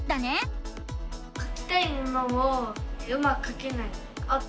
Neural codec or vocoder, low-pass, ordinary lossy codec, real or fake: none; none; none; real